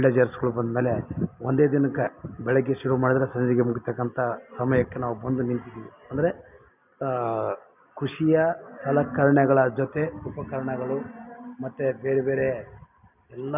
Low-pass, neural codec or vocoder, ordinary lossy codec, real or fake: 3.6 kHz; none; none; real